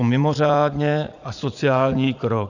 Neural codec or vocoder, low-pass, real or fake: vocoder, 44.1 kHz, 80 mel bands, Vocos; 7.2 kHz; fake